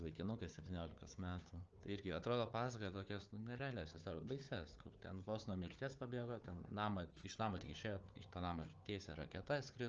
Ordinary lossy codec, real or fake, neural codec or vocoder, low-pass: Opus, 24 kbps; fake; codec, 16 kHz, 4 kbps, FunCodec, trained on Chinese and English, 50 frames a second; 7.2 kHz